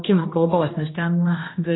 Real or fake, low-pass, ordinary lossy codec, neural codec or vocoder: fake; 7.2 kHz; AAC, 16 kbps; codec, 16 kHz, 1 kbps, X-Codec, HuBERT features, trained on general audio